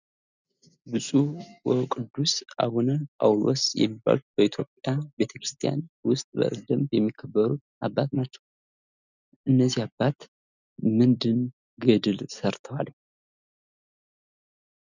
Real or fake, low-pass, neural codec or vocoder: real; 7.2 kHz; none